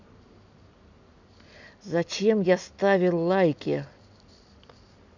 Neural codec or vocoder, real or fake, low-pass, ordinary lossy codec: none; real; 7.2 kHz; none